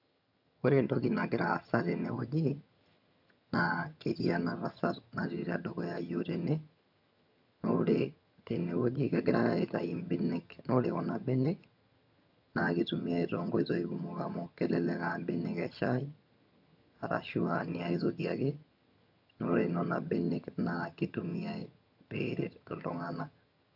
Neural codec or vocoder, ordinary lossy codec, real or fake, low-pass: vocoder, 22.05 kHz, 80 mel bands, HiFi-GAN; none; fake; 5.4 kHz